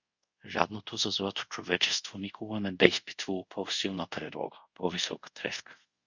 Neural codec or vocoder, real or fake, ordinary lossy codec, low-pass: codec, 24 kHz, 0.5 kbps, DualCodec; fake; Opus, 64 kbps; 7.2 kHz